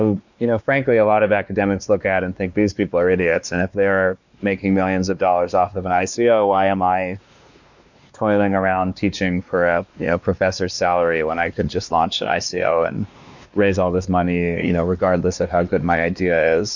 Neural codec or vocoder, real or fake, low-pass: codec, 16 kHz, 2 kbps, X-Codec, WavLM features, trained on Multilingual LibriSpeech; fake; 7.2 kHz